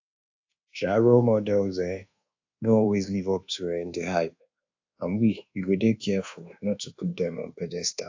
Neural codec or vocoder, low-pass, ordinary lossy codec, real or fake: codec, 24 kHz, 1.2 kbps, DualCodec; 7.2 kHz; AAC, 48 kbps; fake